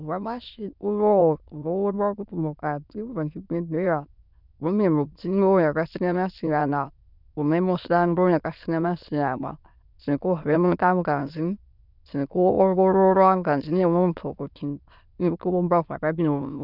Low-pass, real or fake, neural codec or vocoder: 5.4 kHz; fake; autoencoder, 22.05 kHz, a latent of 192 numbers a frame, VITS, trained on many speakers